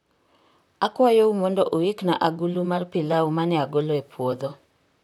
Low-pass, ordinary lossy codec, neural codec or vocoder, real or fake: 19.8 kHz; none; vocoder, 44.1 kHz, 128 mel bands, Pupu-Vocoder; fake